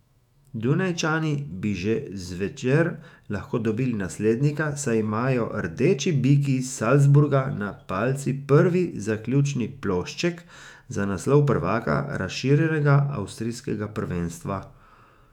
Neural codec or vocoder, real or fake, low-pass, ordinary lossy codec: autoencoder, 48 kHz, 128 numbers a frame, DAC-VAE, trained on Japanese speech; fake; 19.8 kHz; none